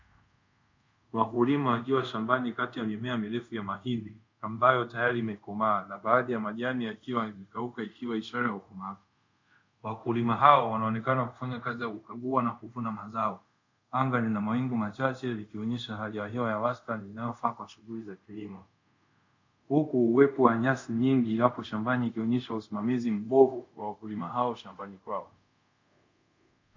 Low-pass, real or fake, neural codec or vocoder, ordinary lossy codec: 7.2 kHz; fake; codec, 24 kHz, 0.5 kbps, DualCodec; MP3, 64 kbps